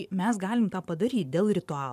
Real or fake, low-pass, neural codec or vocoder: fake; 14.4 kHz; vocoder, 44.1 kHz, 128 mel bands every 512 samples, BigVGAN v2